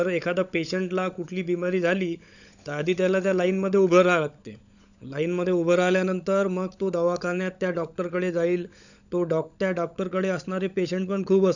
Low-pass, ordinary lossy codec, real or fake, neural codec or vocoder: 7.2 kHz; none; fake; codec, 16 kHz, 16 kbps, FunCodec, trained on LibriTTS, 50 frames a second